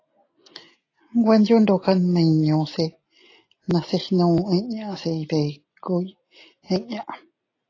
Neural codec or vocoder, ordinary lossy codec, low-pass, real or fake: none; AAC, 32 kbps; 7.2 kHz; real